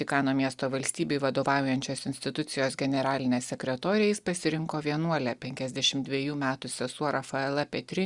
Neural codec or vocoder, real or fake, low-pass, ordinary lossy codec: none; real; 10.8 kHz; Opus, 64 kbps